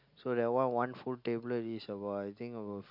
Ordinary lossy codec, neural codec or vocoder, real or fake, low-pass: none; none; real; 5.4 kHz